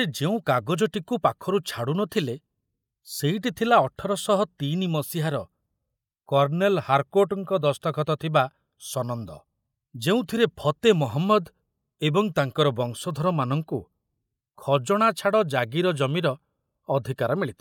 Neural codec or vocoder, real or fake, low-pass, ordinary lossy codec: none; real; 19.8 kHz; none